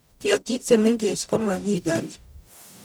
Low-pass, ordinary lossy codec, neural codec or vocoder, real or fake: none; none; codec, 44.1 kHz, 0.9 kbps, DAC; fake